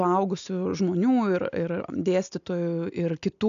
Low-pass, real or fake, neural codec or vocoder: 7.2 kHz; real; none